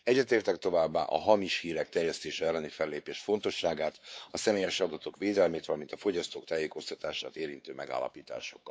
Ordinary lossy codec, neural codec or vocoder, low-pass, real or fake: none; codec, 16 kHz, 4 kbps, X-Codec, WavLM features, trained on Multilingual LibriSpeech; none; fake